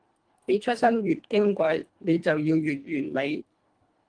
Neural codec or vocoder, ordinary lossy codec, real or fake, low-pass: codec, 24 kHz, 1.5 kbps, HILCodec; Opus, 24 kbps; fake; 9.9 kHz